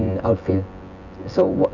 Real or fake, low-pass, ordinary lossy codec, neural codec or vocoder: fake; 7.2 kHz; none; vocoder, 24 kHz, 100 mel bands, Vocos